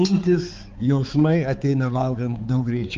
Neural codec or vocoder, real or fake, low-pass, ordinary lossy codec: codec, 16 kHz, 4 kbps, X-Codec, HuBERT features, trained on balanced general audio; fake; 7.2 kHz; Opus, 24 kbps